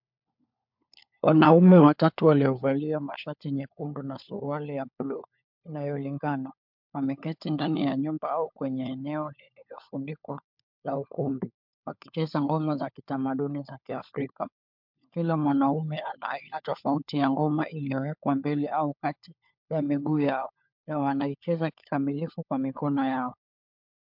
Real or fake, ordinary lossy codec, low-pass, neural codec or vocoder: fake; MP3, 48 kbps; 5.4 kHz; codec, 16 kHz, 4 kbps, FunCodec, trained on LibriTTS, 50 frames a second